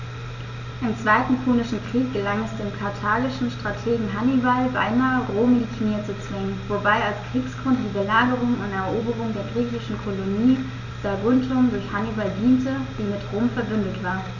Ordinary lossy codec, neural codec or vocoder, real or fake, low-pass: none; none; real; 7.2 kHz